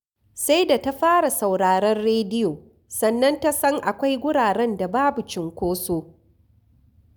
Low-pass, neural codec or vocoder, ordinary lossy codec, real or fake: none; none; none; real